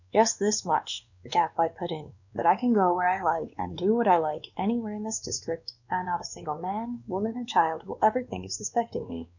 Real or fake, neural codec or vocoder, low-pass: fake; codec, 16 kHz, 2 kbps, X-Codec, WavLM features, trained on Multilingual LibriSpeech; 7.2 kHz